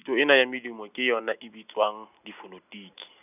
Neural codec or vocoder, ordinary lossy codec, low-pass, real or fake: none; none; 3.6 kHz; real